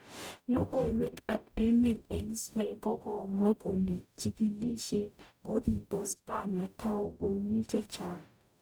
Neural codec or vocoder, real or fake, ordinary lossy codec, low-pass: codec, 44.1 kHz, 0.9 kbps, DAC; fake; none; none